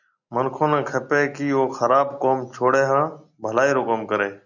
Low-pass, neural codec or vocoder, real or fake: 7.2 kHz; none; real